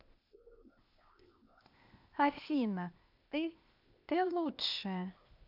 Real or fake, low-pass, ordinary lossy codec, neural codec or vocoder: fake; 5.4 kHz; none; codec, 16 kHz, 0.8 kbps, ZipCodec